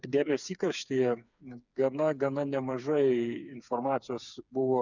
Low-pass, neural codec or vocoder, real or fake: 7.2 kHz; codec, 16 kHz, 4 kbps, FreqCodec, smaller model; fake